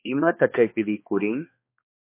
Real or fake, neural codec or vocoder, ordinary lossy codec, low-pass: fake; codec, 16 kHz, 2 kbps, X-Codec, HuBERT features, trained on balanced general audio; MP3, 24 kbps; 3.6 kHz